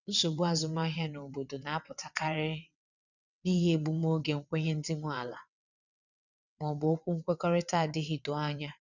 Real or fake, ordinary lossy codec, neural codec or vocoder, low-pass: fake; none; vocoder, 22.05 kHz, 80 mel bands, WaveNeXt; 7.2 kHz